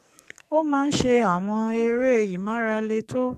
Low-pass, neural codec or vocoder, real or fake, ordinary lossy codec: 14.4 kHz; codec, 44.1 kHz, 2.6 kbps, SNAC; fake; none